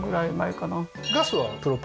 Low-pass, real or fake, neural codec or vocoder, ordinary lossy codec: none; real; none; none